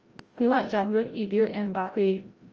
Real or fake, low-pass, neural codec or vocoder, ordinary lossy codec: fake; 7.2 kHz; codec, 16 kHz, 0.5 kbps, FreqCodec, larger model; Opus, 24 kbps